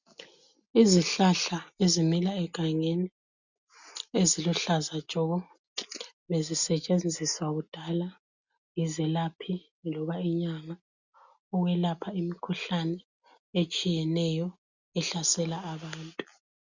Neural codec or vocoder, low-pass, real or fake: none; 7.2 kHz; real